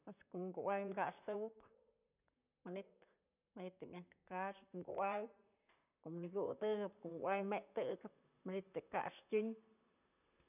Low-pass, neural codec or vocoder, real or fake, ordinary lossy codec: 3.6 kHz; vocoder, 44.1 kHz, 128 mel bands, Pupu-Vocoder; fake; none